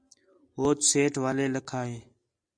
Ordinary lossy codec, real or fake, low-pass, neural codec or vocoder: Opus, 64 kbps; real; 9.9 kHz; none